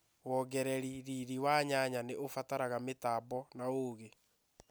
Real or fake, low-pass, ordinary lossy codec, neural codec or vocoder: real; none; none; none